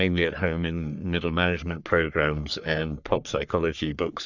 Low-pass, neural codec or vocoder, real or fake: 7.2 kHz; codec, 44.1 kHz, 3.4 kbps, Pupu-Codec; fake